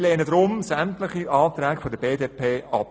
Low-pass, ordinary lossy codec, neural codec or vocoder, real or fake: none; none; none; real